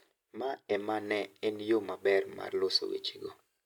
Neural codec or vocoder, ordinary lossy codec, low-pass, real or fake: none; none; 19.8 kHz; real